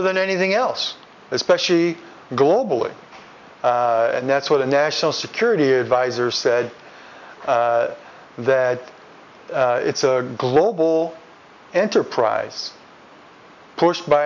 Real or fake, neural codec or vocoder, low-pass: real; none; 7.2 kHz